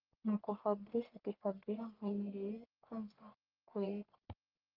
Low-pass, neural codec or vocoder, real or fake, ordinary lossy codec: 5.4 kHz; codec, 44.1 kHz, 1.7 kbps, Pupu-Codec; fake; Opus, 32 kbps